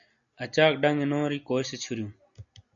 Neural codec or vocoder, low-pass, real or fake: none; 7.2 kHz; real